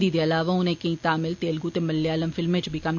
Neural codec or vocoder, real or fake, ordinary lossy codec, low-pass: none; real; none; 7.2 kHz